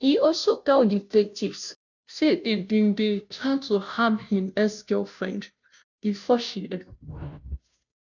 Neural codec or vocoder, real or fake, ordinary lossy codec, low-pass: codec, 16 kHz, 0.5 kbps, FunCodec, trained on Chinese and English, 25 frames a second; fake; none; 7.2 kHz